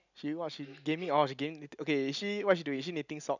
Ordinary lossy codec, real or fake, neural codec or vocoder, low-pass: none; real; none; 7.2 kHz